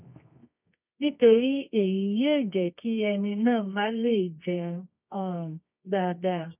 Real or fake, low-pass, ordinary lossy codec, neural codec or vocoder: fake; 3.6 kHz; none; codec, 24 kHz, 0.9 kbps, WavTokenizer, medium music audio release